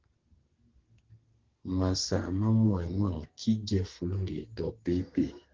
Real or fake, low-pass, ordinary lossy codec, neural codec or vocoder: fake; 7.2 kHz; Opus, 16 kbps; codec, 32 kHz, 1.9 kbps, SNAC